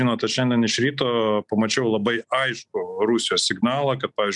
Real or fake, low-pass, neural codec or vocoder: real; 10.8 kHz; none